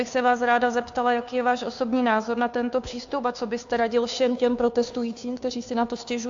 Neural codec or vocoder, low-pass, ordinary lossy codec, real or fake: codec, 16 kHz, 2 kbps, FunCodec, trained on Chinese and English, 25 frames a second; 7.2 kHz; AAC, 64 kbps; fake